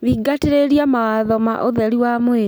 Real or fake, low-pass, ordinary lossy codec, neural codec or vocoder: real; none; none; none